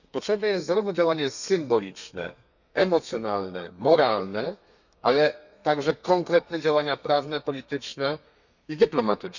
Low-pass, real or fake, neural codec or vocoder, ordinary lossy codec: 7.2 kHz; fake; codec, 32 kHz, 1.9 kbps, SNAC; none